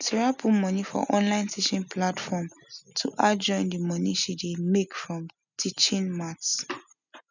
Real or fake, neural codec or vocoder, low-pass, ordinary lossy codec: real; none; 7.2 kHz; none